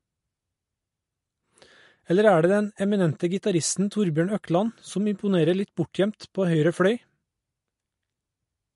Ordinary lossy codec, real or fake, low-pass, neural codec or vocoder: MP3, 48 kbps; real; 14.4 kHz; none